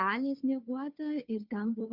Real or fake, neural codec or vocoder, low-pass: fake; codec, 16 kHz, 2 kbps, FunCodec, trained on Chinese and English, 25 frames a second; 5.4 kHz